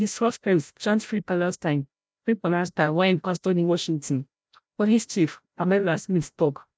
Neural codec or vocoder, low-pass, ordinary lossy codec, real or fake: codec, 16 kHz, 0.5 kbps, FreqCodec, larger model; none; none; fake